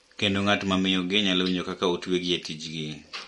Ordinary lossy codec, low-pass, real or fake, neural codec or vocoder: MP3, 48 kbps; 19.8 kHz; real; none